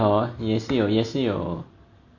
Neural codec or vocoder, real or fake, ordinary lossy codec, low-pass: none; real; none; 7.2 kHz